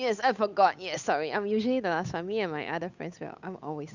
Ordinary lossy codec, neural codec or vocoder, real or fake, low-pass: Opus, 64 kbps; codec, 16 kHz, 8 kbps, FunCodec, trained on Chinese and English, 25 frames a second; fake; 7.2 kHz